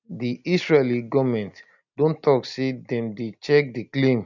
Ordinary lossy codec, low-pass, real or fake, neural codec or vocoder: none; 7.2 kHz; real; none